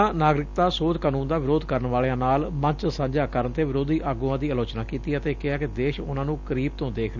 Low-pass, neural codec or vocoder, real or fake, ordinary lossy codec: 7.2 kHz; none; real; none